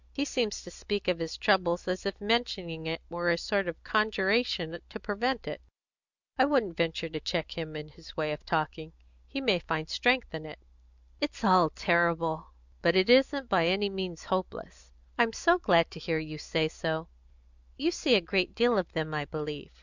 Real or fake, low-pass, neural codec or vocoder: real; 7.2 kHz; none